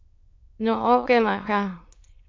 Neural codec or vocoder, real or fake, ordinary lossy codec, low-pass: autoencoder, 22.05 kHz, a latent of 192 numbers a frame, VITS, trained on many speakers; fake; MP3, 48 kbps; 7.2 kHz